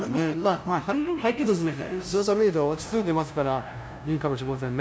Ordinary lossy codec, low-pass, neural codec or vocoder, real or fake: none; none; codec, 16 kHz, 0.5 kbps, FunCodec, trained on LibriTTS, 25 frames a second; fake